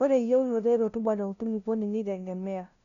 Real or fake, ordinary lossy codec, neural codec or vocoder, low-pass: fake; none; codec, 16 kHz, 0.5 kbps, FunCodec, trained on LibriTTS, 25 frames a second; 7.2 kHz